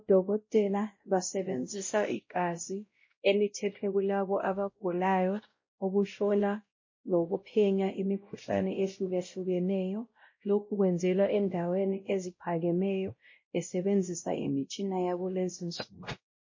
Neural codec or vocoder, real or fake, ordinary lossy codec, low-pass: codec, 16 kHz, 0.5 kbps, X-Codec, WavLM features, trained on Multilingual LibriSpeech; fake; MP3, 32 kbps; 7.2 kHz